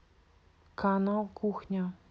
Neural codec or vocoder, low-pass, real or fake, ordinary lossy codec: none; none; real; none